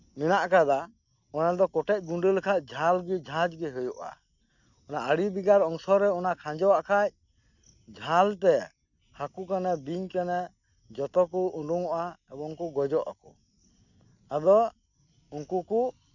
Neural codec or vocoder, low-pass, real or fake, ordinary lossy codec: none; 7.2 kHz; real; none